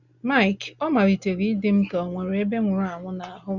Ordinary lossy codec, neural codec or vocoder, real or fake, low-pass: Opus, 64 kbps; none; real; 7.2 kHz